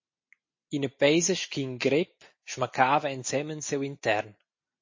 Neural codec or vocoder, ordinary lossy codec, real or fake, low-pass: none; MP3, 32 kbps; real; 7.2 kHz